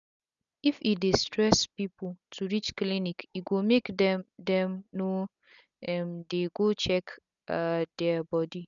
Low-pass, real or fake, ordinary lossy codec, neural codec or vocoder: 7.2 kHz; real; none; none